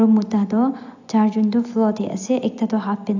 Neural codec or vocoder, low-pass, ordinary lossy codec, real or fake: none; 7.2 kHz; AAC, 48 kbps; real